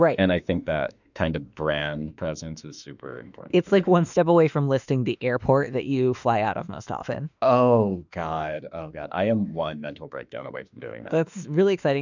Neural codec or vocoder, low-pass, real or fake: autoencoder, 48 kHz, 32 numbers a frame, DAC-VAE, trained on Japanese speech; 7.2 kHz; fake